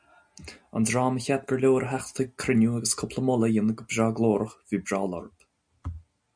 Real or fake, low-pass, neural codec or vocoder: real; 9.9 kHz; none